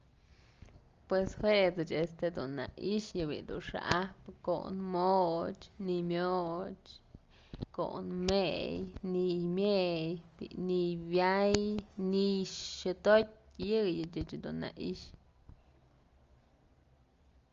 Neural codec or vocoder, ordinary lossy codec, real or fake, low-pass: none; Opus, 32 kbps; real; 7.2 kHz